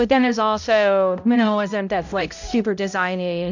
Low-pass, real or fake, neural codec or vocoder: 7.2 kHz; fake; codec, 16 kHz, 0.5 kbps, X-Codec, HuBERT features, trained on balanced general audio